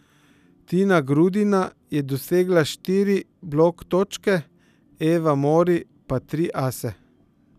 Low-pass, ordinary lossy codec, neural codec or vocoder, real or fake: 14.4 kHz; none; none; real